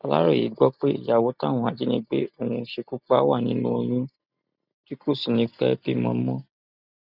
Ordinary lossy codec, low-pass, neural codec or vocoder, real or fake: none; 5.4 kHz; none; real